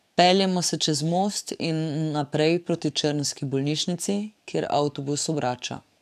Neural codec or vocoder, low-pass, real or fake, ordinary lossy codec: codec, 44.1 kHz, 7.8 kbps, DAC; 14.4 kHz; fake; none